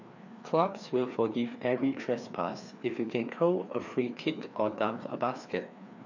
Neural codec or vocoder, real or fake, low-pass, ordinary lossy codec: codec, 16 kHz, 2 kbps, FreqCodec, larger model; fake; 7.2 kHz; none